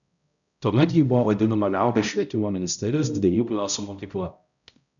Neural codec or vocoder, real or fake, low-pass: codec, 16 kHz, 0.5 kbps, X-Codec, HuBERT features, trained on balanced general audio; fake; 7.2 kHz